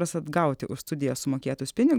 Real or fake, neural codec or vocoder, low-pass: real; none; 19.8 kHz